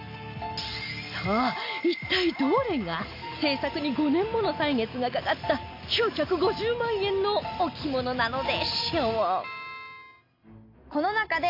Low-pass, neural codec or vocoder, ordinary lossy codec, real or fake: 5.4 kHz; none; AAC, 32 kbps; real